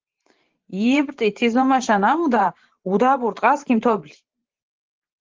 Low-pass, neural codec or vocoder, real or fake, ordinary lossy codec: 7.2 kHz; vocoder, 44.1 kHz, 128 mel bands every 512 samples, BigVGAN v2; fake; Opus, 16 kbps